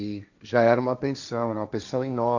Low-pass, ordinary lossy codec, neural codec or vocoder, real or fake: 7.2 kHz; none; codec, 16 kHz, 1.1 kbps, Voila-Tokenizer; fake